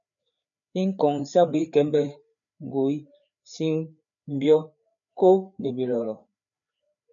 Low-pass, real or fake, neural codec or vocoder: 7.2 kHz; fake; codec, 16 kHz, 4 kbps, FreqCodec, larger model